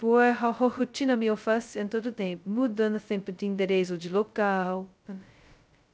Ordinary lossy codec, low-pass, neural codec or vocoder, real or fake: none; none; codec, 16 kHz, 0.2 kbps, FocalCodec; fake